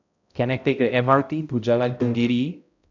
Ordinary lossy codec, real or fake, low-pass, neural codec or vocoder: none; fake; 7.2 kHz; codec, 16 kHz, 0.5 kbps, X-Codec, HuBERT features, trained on balanced general audio